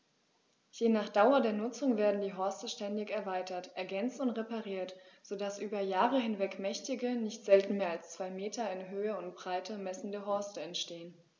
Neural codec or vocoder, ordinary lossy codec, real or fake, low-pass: none; none; real; none